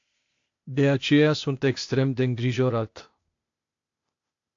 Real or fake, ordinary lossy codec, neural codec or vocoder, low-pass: fake; AAC, 48 kbps; codec, 16 kHz, 0.8 kbps, ZipCodec; 7.2 kHz